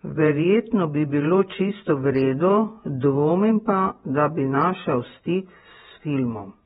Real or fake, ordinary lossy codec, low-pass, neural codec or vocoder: fake; AAC, 16 kbps; 19.8 kHz; vocoder, 48 kHz, 128 mel bands, Vocos